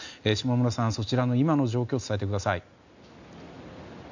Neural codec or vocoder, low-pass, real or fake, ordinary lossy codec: none; 7.2 kHz; real; none